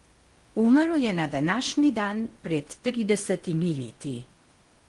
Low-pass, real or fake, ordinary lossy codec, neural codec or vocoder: 10.8 kHz; fake; Opus, 24 kbps; codec, 16 kHz in and 24 kHz out, 0.8 kbps, FocalCodec, streaming, 65536 codes